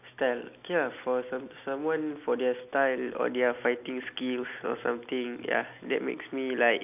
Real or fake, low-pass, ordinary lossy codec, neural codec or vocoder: real; 3.6 kHz; none; none